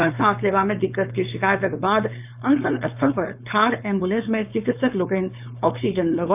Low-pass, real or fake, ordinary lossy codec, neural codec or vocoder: 3.6 kHz; fake; none; codec, 16 kHz, 4.8 kbps, FACodec